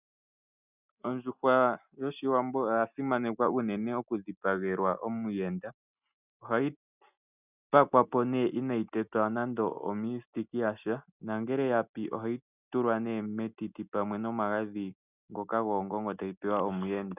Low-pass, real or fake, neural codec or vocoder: 3.6 kHz; real; none